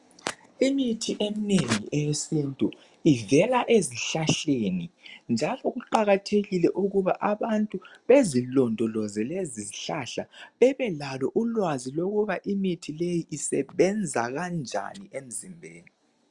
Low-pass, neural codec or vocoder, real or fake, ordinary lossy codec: 10.8 kHz; none; real; Opus, 64 kbps